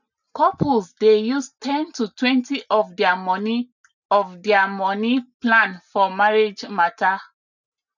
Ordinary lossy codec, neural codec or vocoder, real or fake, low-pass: none; none; real; 7.2 kHz